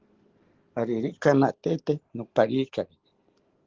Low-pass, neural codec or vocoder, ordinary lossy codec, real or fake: 7.2 kHz; none; Opus, 16 kbps; real